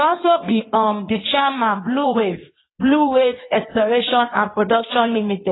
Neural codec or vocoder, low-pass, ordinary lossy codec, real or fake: codec, 16 kHz in and 24 kHz out, 1.1 kbps, FireRedTTS-2 codec; 7.2 kHz; AAC, 16 kbps; fake